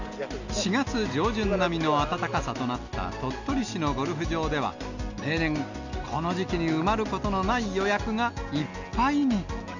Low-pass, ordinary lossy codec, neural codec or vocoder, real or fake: 7.2 kHz; none; none; real